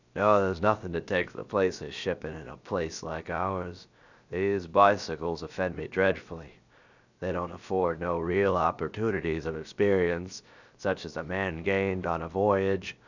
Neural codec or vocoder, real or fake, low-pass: codec, 16 kHz, 0.7 kbps, FocalCodec; fake; 7.2 kHz